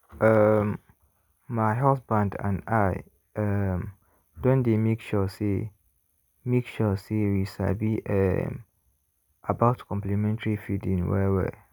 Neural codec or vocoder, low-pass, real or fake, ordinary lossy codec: none; none; real; none